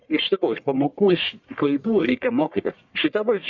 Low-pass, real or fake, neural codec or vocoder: 7.2 kHz; fake; codec, 44.1 kHz, 1.7 kbps, Pupu-Codec